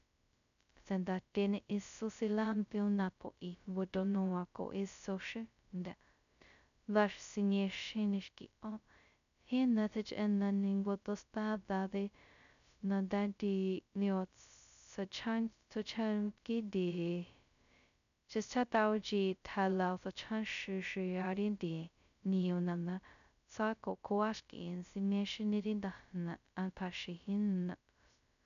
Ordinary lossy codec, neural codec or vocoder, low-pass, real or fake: none; codec, 16 kHz, 0.2 kbps, FocalCodec; 7.2 kHz; fake